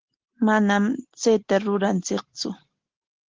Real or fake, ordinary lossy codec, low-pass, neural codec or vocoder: real; Opus, 16 kbps; 7.2 kHz; none